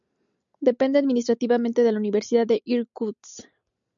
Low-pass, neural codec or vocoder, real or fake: 7.2 kHz; none; real